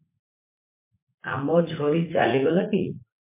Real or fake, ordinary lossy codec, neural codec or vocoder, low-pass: fake; MP3, 24 kbps; vocoder, 44.1 kHz, 80 mel bands, Vocos; 3.6 kHz